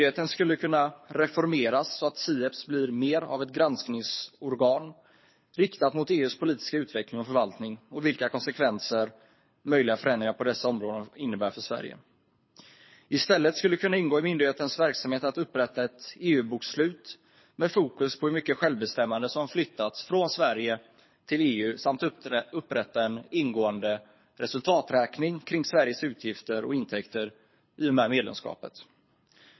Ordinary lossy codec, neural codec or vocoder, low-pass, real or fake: MP3, 24 kbps; codec, 24 kHz, 6 kbps, HILCodec; 7.2 kHz; fake